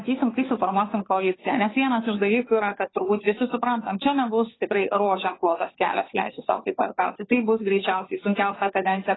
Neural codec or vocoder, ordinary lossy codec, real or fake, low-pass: codec, 16 kHz, 2 kbps, FunCodec, trained on Chinese and English, 25 frames a second; AAC, 16 kbps; fake; 7.2 kHz